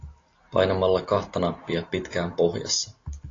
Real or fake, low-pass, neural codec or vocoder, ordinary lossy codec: real; 7.2 kHz; none; AAC, 32 kbps